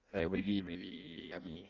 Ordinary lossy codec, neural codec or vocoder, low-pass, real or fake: Opus, 32 kbps; codec, 16 kHz in and 24 kHz out, 0.6 kbps, FireRedTTS-2 codec; 7.2 kHz; fake